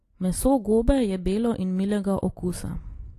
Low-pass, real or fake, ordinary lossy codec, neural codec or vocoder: 14.4 kHz; real; AAC, 48 kbps; none